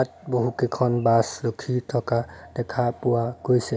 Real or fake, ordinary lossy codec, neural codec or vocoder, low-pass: real; none; none; none